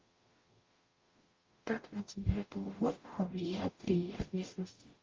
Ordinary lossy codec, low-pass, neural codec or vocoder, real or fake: Opus, 32 kbps; 7.2 kHz; codec, 44.1 kHz, 0.9 kbps, DAC; fake